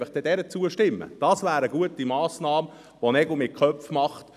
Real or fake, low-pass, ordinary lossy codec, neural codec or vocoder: real; 14.4 kHz; none; none